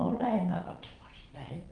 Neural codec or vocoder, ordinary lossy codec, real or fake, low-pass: vocoder, 22.05 kHz, 80 mel bands, Vocos; Opus, 24 kbps; fake; 9.9 kHz